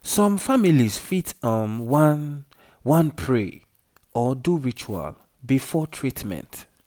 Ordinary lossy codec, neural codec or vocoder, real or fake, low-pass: none; none; real; none